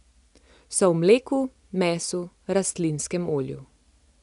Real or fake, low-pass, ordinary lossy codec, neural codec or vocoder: real; 10.8 kHz; none; none